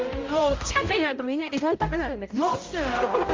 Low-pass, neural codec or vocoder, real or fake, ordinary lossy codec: 7.2 kHz; codec, 16 kHz, 0.5 kbps, X-Codec, HuBERT features, trained on balanced general audio; fake; Opus, 32 kbps